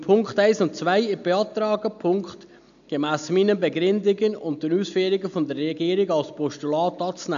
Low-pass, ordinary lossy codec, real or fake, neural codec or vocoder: 7.2 kHz; none; real; none